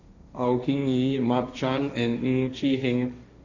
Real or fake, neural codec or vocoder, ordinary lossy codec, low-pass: fake; codec, 16 kHz, 1.1 kbps, Voila-Tokenizer; none; 7.2 kHz